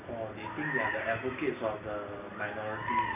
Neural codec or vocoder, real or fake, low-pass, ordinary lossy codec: none; real; 3.6 kHz; none